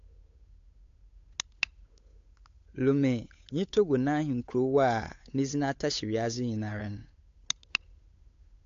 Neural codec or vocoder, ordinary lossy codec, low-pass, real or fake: codec, 16 kHz, 8 kbps, FunCodec, trained on Chinese and English, 25 frames a second; AAC, 48 kbps; 7.2 kHz; fake